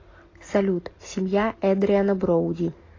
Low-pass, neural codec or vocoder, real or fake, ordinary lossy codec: 7.2 kHz; none; real; AAC, 32 kbps